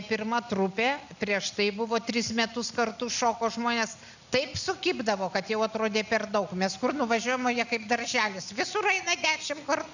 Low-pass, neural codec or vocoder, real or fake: 7.2 kHz; none; real